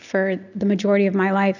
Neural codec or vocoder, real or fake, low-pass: none; real; 7.2 kHz